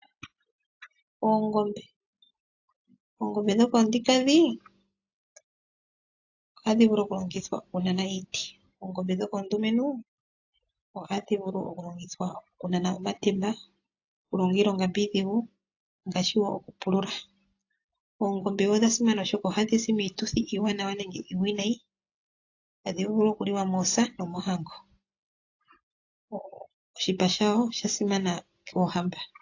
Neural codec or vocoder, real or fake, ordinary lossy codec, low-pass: none; real; AAC, 48 kbps; 7.2 kHz